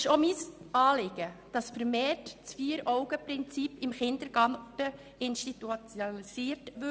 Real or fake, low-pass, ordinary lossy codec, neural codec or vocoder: real; none; none; none